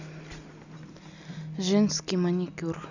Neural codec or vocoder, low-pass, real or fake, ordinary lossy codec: none; 7.2 kHz; real; none